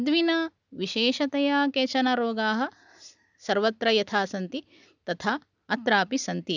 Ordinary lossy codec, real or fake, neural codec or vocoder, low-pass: none; real; none; 7.2 kHz